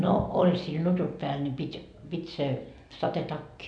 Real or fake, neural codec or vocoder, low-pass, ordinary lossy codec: real; none; 9.9 kHz; none